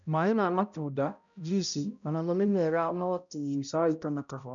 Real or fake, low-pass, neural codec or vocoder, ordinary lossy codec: fake; 7.2 kHz; codec, 16 kHz, 0.5 kbps, X-Codec, HuBERT features, trained on balanced general audio; none